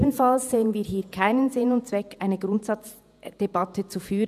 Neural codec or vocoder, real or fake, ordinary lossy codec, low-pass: vocoder, 48 kHz, 128 mel bands, Vocos; fake; none; 14.4 kHz